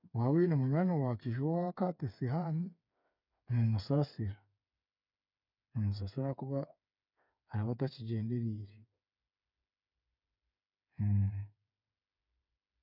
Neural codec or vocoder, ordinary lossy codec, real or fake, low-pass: codec, 16 kHz, 8 kbps, FreqCodec, smaller model; none; fake; 5.4 kHz